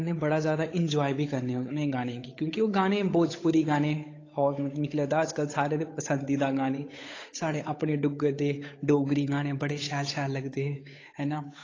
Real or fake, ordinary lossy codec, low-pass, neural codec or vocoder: fake; AAC, 32 kbps; 7.2 kHz; codec, 16 kHz, 8 kbps, FunCodec, trained on Chinese and English, 25 frames a second